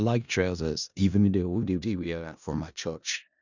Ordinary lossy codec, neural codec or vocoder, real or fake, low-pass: none; codec, 16 kHz in and 24 kHz out, 0.4 kbps, LongCat-Audio-Codec, four codebook decoder; fake; 7.2 kHz